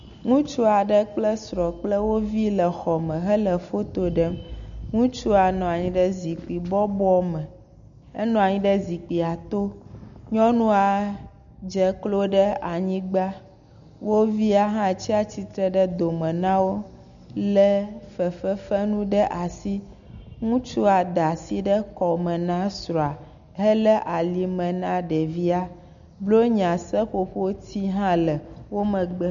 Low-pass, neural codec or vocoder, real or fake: 7.2 kHz; none; real